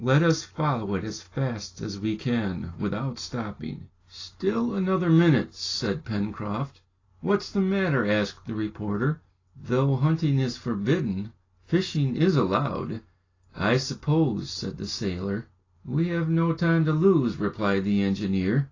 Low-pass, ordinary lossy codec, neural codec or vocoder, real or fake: 7.2 kHz; AAC, 32 kbps; none; real